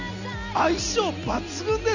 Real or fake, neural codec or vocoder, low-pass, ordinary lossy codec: real; none; 7.2 kHz; none